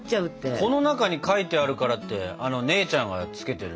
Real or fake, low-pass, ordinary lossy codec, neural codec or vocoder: real; none; none; none